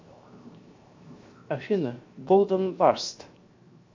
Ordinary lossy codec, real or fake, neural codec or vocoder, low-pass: MP3, 48 kbps; fake; codec, 16 kHz, 0.7 kbps, FocalCodec; 7.2 kHz